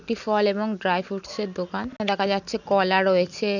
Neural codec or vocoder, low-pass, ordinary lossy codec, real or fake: none; 7.2 kHz; none; real